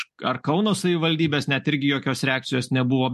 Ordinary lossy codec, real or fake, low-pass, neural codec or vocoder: MP3, 64 kbps; real; 14.4 kHz; none